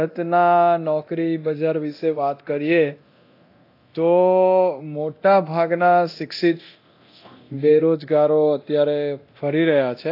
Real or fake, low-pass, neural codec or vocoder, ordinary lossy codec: fake; 5.4 kHz; codec, 24 kHz, 0.9 kbps, DualCodec; AAC, 32 kbps